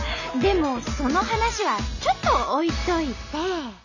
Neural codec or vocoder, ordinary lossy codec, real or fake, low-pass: none; none; real; 7.2 kHz